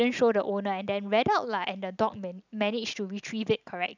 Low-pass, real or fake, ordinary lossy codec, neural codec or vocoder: 7.2 kHz; real; none; none